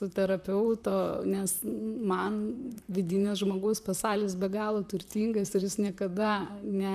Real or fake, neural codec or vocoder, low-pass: fake; vocoder, 44.1 kHz, 128 mel bands, Pupu-Vocoder; 14.4 kHz